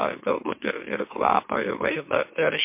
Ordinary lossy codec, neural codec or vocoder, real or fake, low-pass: MP3, 24 kbps; autoencoder, 44.1 kHz, a latent of 192 numbers a frame, MeloTTS; fake; 3.6 kHz